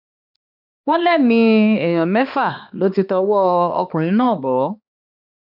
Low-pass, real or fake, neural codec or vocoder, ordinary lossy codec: 5.4 kHz; fake; codec, 16 kHz, 2 kbps, X-Codec, HuBERT features, trained on balanced general audio; none